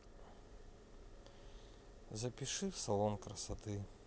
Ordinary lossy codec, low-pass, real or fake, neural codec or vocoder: none; none; real; none